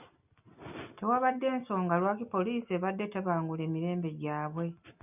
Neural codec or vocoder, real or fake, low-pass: none; real; 3.6 kHz